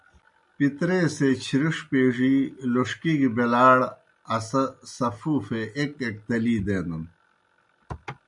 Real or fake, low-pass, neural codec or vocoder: real; 10.8 kHz; none